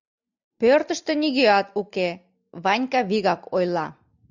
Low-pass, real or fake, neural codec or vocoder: 7.2 kHz; real; none